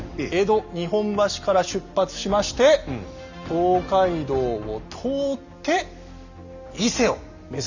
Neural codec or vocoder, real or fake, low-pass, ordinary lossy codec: none; real; 7.2 kHz; none